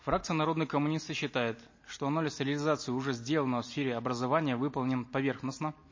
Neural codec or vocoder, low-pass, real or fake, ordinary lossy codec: none; 7.2 kHz; real; MP3, 32 kbps